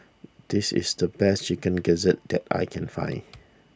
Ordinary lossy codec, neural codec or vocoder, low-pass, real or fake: none; none; none; real